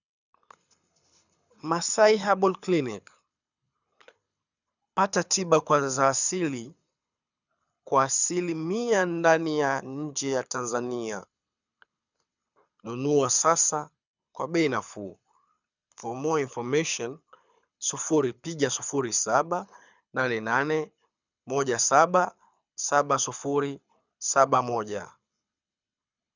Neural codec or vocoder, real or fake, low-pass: codec, 24 kHz, 6 kbps, HILCodec; fake; 7.2 kHz